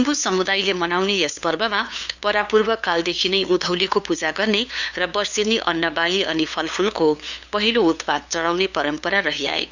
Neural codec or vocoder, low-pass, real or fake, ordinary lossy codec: codec, 16 kHz, 2 kbps, FunCodec, trained on LibriTTS, 25 frames a second; 7.2 kHz; fake; none